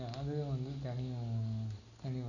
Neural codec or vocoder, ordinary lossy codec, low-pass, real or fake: none; none; 7.2 kHz; real